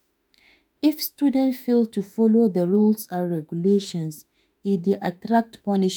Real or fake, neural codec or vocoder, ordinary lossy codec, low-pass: fake; autoencoder, 48 kHz, 32 numbers a frame, DAC-VAE, trained on Japanese speech; none; none